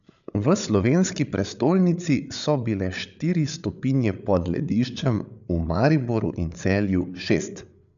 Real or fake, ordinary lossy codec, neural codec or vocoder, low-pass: fake; none; codec, 16 kHz, 8 kbps, FreqCodec, larger model; 7.2 kHz